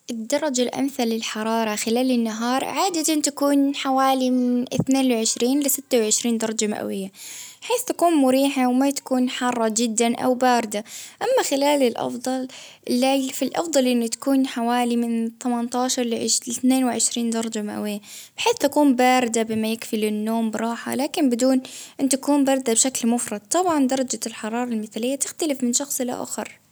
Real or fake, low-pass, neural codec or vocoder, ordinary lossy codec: real; none; none; none